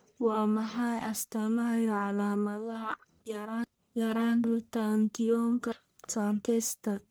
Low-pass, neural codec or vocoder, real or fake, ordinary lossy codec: none; codec, 44.1 kHz, 1.7 kbps, Pupu-Codec; fake; none